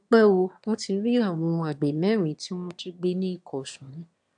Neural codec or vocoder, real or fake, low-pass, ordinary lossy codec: autoencoder, 22.05 kHz, a latent of 192 numbers a frame, VITS, trained on one speaker; fake; 9.9 kHz; AAC, 64 kbps